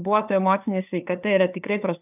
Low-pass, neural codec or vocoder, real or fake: 3.6 kHz; codec, 16 kHz, 2 kbps, FunCodec, trained on LibriTTS, 25 frames a second; fake